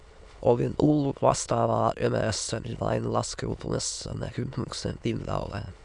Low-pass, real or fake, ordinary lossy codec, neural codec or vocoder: 9.9 kHz; fake; MP3, 96 kbps; autoencoder, 22.05 kHz, a latent of 192 numbers a frame, VITS, trained on many speakers